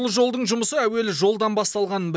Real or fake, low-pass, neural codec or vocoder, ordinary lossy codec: real; none; none; none